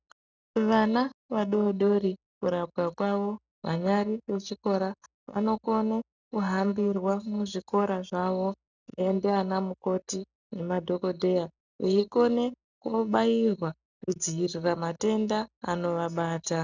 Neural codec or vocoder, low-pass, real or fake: none; 7.2 kHz; real